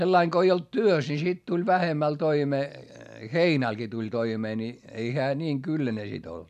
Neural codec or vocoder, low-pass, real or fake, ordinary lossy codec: none; 14.4 kHz; real; none